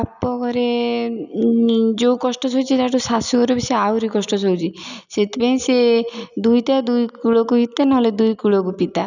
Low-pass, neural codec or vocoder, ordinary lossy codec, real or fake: 7.2 kHz; none; none; real